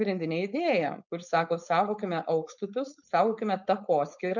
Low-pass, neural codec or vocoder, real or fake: 7.2 kHz; codec, 16 kHz, 4.8 kbps, FACodec; fake